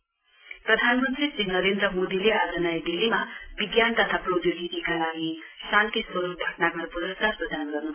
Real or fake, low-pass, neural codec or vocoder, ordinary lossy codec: real; 3.6 kHz; none; AAC, 24 kbps